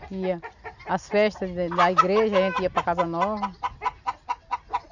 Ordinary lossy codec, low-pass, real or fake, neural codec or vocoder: none; 7.2 kHz; real; none